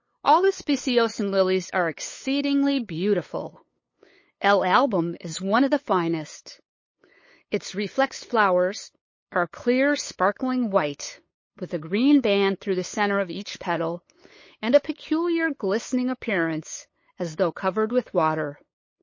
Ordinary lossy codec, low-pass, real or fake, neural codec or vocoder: MP3, 32 kbps; 7.2 kHz; fake; codec, 16 kHz, 8 kbps, FunCodec, trained on LibriTTS, 25 frames a second